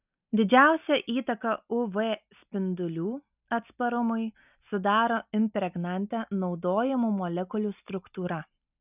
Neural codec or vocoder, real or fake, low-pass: none; real; 3.6 kHz